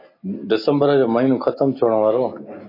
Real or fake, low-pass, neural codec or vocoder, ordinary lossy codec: real; 5.4 kHz; none; AAC, 32 kbps